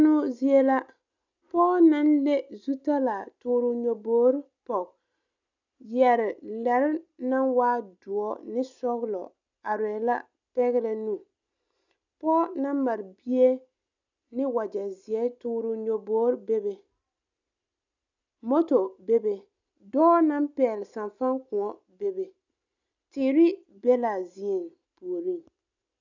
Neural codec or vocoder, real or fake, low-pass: none; real; 7.2 kHz